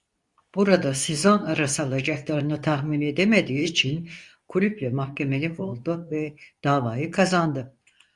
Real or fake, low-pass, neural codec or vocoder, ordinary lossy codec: fake; 10.8 kHz; codec, 24 kHz, 0.9 kbps, WavTokenizer, medium speech release version 2; Opus, 64 kbps